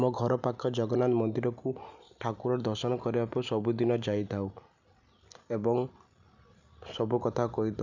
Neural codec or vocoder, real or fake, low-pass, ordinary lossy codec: none; real; 7.2 kHz; none